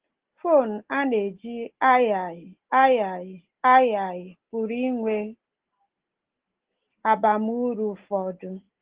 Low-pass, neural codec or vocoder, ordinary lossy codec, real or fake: 3.6 kHz; none; Opus, 16 kbps; real